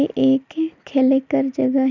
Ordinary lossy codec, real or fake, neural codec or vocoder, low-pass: none; real; none; 7.2 kHz